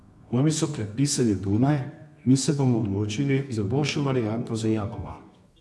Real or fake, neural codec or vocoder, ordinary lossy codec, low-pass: fake; codec, 24 kHz, 0.9 kbps, WavTokenizer, medium music audio release; none; none